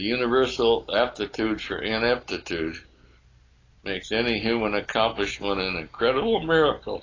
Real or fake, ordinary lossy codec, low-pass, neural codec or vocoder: real; AAC, 32 kbps; 7.2 kHz; none